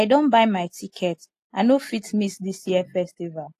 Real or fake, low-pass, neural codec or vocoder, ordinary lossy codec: real; 14.4 kHz; none; AAC, 48 kbps